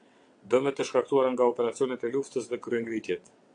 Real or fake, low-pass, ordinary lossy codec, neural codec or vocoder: fake; 9.9 kHz; AAC, 48 kbps; vocoder, 22.05 kHz, 80 mel bands, WaveNeXt